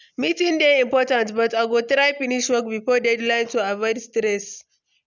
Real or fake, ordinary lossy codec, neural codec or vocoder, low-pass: real; none; none; 7.2 kHz